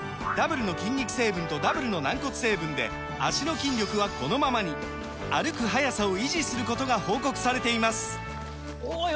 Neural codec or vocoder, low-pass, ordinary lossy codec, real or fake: none; none; none; real